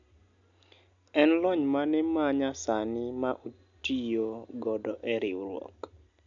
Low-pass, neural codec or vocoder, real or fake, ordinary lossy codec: 7.2 kHz; none; real; none